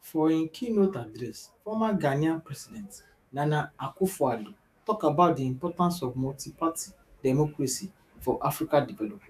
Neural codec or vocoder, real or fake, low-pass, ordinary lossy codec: autoencoder, 48 kHz, 128 numbers a frame, DAC-VAE, trained on Japanese speech; fake; 14.4 kHz; AAC, 96 kbps